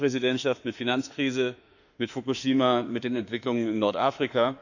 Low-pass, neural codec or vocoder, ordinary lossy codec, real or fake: 7.2 kHz; autoencoder, 48 kHz, 32 numbers a frame, DAC-VAE, trained on Japanese speech; none; fake